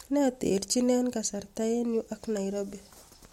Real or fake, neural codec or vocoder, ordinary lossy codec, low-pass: real; none; MP3, 64 kbps; 19.8 kHz